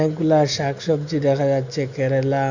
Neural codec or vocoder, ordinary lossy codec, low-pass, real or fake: none; Opus, 64 kbps; 7.2 kHz; real